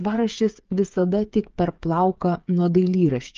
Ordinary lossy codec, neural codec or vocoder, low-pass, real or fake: Opus, 24 kbps; codec, 16 kHz, 8 kbps, FreqCodec, smaller model; 7.2 kHz; fake